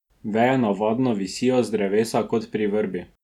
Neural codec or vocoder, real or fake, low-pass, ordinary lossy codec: none; real; 19.8 kHz; none